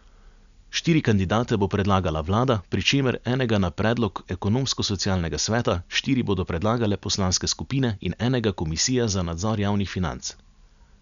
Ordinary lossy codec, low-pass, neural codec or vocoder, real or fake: none; 7.2 kHz; none; real